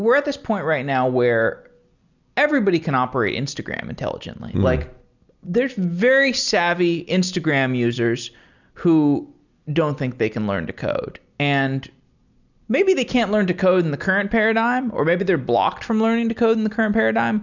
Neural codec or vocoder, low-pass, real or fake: none; 7.2 kHz; real